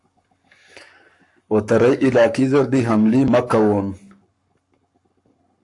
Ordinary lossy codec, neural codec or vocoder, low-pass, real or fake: AAC, 64 kbps; codec, 44.1 kHz, 7.8 kbps, Pupu-Codec; 10.8 kHz; fake